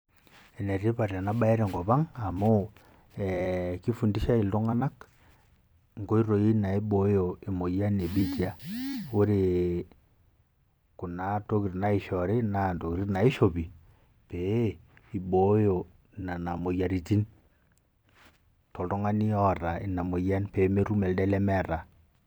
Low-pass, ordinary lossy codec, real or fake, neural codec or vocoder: none; none; fake; vocoder, 44.1 kHz, 128 mel bands every 256 samples, BigVGAN v2